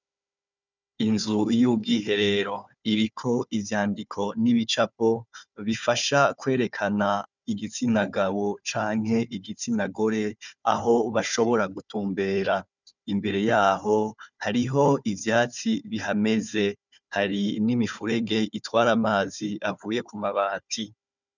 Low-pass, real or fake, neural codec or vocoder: 7.2 kHz; fake; codec, 16 kHz, 4 kbps, FunCodec, trained on Chinese and English, 50 frames a second